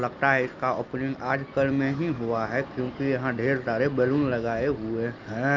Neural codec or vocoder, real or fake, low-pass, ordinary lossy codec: none; real; 7.2 kHz; Opus, 32 kbps